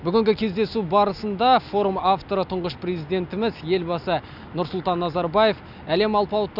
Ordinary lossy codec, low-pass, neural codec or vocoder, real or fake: none; 5.4 kHz; none; real